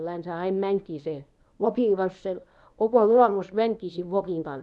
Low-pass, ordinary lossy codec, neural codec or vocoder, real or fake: none; none; codec, 24 kHz, 0.9 kbps, WavTokenizer, medium speech release version 1; fake